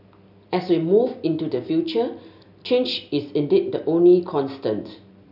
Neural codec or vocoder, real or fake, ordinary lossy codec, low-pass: none; real; none; 5.4 kHz